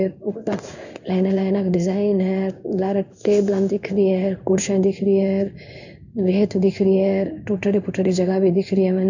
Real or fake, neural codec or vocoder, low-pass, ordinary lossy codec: fake; codec, 16 kHz in and 24 kHz out, 1 kbps, XY-Tokenizer; 7.2 kHz; none